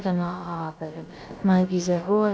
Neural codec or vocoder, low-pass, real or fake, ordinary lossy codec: codec, 16 kHz, about 1 kbps, DyCAST, with the encoder's durations; none; fake; none